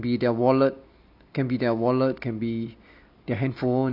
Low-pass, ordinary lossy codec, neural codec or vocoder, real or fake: 5.4 kHz; none; none; real